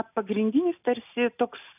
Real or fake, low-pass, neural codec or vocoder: real; 3.6 kHz; none